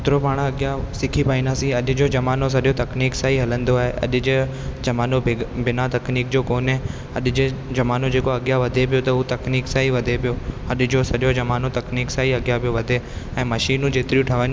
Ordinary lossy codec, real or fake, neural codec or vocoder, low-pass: none; real; none; none